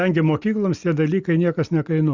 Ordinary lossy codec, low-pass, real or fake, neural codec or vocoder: Opus, 64 kbps; 7.2 kHz; real; none